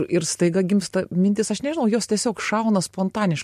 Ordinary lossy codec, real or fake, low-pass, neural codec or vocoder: MP3, 64 kbps; real; 14.4 kHz; none